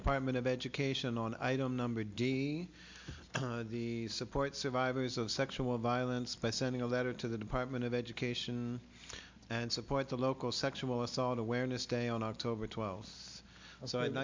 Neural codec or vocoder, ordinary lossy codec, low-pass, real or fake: none; MP3, 64 kbps; 7.2 kHz; real